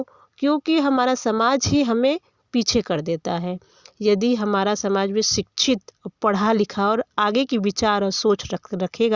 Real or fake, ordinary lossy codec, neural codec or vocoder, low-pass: real; Opus, 64 kbps; none; 7.2 kHz